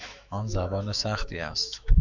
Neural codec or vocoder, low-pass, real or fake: autoencoder, 48 kHz, 128 numbers a frame, DAC-VAE, trained on Japanese speech; 7.2 kHz; fake